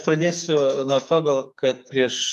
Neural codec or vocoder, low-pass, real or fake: codec, 44.1 kHz, 2.6 kbps, SNAC; 14.4 kHz; fake